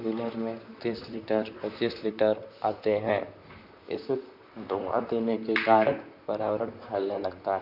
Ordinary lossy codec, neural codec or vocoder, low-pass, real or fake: none; vocoder, 44.1 kHz, 128 mel bands, Pupu-Vocoder; 5.4 kHz; fake